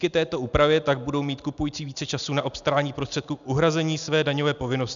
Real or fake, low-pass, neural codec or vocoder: real; 7.2 kHz; none